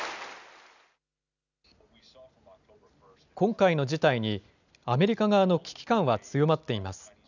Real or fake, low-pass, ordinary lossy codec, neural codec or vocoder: real; 7.2 kHz; none; none